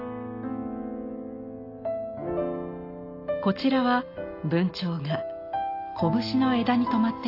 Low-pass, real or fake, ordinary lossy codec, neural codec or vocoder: 5.4 kHz; real; AAC, 32 kbps; none